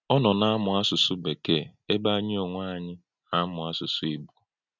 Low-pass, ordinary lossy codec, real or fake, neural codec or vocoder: 7.2 kHz; none; real; none